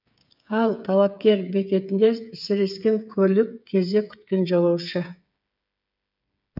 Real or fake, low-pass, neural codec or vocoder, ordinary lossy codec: fake; 5.4 kHz; codec, 16 kHz, 8 kbps, FreqCodec, smaller model; none